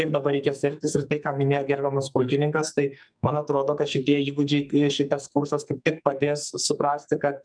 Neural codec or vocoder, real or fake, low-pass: codec, 44.1 kHz, 2.6 kbps, SNAC; fake; 9.9 kHz